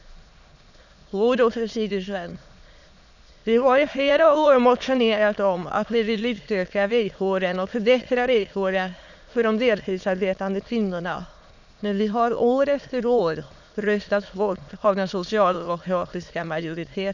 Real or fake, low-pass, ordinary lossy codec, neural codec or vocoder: fake; 7.2 kHz; none; autoencoder, 22.05 kHz, a latent of 192 numbers a frame, VITS, trained on many speakers